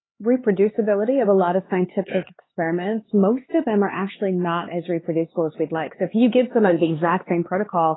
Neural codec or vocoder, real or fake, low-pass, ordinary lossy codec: codec, 16 kHz, 4 kbps, X-Codec, HuBERT features, trained on LibriSpeech; fake; 7.2 kHz; AAC, 16 kbps